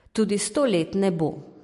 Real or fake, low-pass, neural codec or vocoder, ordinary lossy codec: real; 14.4 kHz; none; MP3, 48 kbps